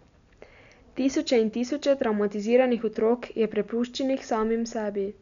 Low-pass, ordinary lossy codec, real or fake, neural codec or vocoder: 7.2 kHz; MP3, 96 kbps; real; none